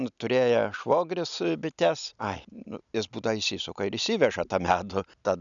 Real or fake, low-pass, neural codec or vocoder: real; 7.2 kHz; none